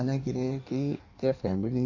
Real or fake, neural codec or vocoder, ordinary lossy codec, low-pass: fake; codec, 16 kHz in and 24 kHz out, 1.1 kbps, FireRedTTS-2 codec; AAC, 48 kbps; 7.2 kHz